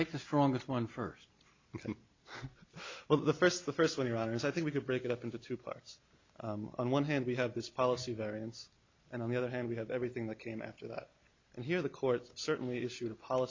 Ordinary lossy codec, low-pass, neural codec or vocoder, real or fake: MP3, 64 kbps; 7.2 kHz; none; real